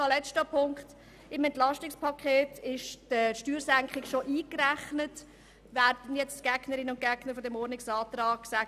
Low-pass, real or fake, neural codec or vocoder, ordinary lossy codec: 14.4 kHz; real; none; none